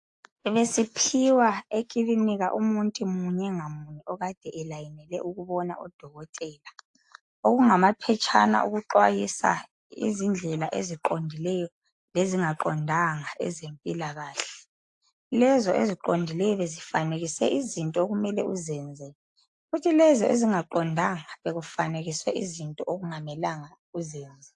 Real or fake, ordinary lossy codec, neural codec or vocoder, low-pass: real; AAC, 64 kbps; none; 10.8 kHz